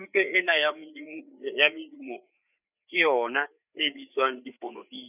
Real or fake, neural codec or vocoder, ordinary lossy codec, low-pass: fake; codec, 16 kHz, 4 kbps, FreqCodec, larger model; none; 3.6 kHz